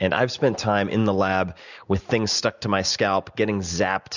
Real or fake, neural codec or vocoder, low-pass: real; none; 7.2 kHz